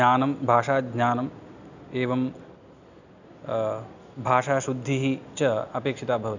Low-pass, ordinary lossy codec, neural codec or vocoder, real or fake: 7.2 kHz; none; none; real